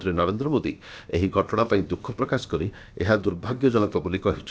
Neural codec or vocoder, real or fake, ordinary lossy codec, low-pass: codec, 16 kHz, about 1 kbps, DyCAST, with the encoder's durations; fake; none; none